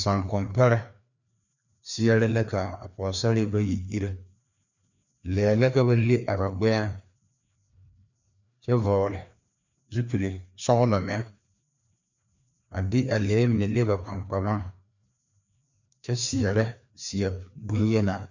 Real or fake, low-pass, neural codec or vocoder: fake; 7.2 kHz; codec, 16 kHz, 2 kbps, FreqCodec, larger model